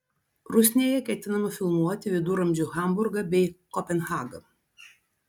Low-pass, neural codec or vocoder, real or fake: 19.8 kHz; none; real